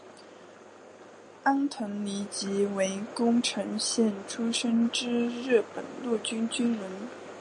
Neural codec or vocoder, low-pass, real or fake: none; 9.9 kHz; real